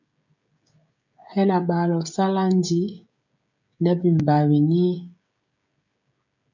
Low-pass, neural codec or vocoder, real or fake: 7.2 kHz; codec, 16 kHz, 16 kbps, FreqCodec, smaller model; fake